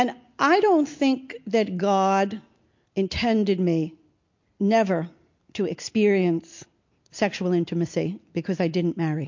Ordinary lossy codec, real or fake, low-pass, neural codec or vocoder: MP3, 48 kbps; real; 7.2 kHz; none